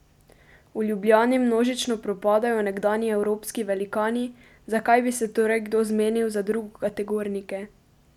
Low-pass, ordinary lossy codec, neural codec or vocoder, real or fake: 19.8 kHz; none; none; real